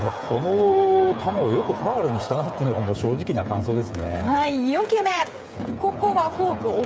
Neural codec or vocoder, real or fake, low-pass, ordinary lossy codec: codec, 16 kHz, 8 kbps, FreqCodec, smaller model; fake; none; none